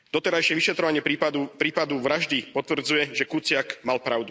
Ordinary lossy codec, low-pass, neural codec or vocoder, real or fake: none; none; none; real